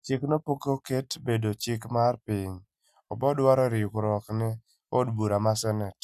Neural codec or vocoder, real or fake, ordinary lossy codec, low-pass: none; real; none; 14.4 kHz